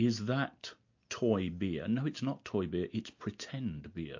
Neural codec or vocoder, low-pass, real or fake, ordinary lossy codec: none; 7.2 kHz; real; MP3, 48 kbps